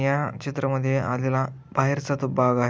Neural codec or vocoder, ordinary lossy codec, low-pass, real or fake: none; none; none; real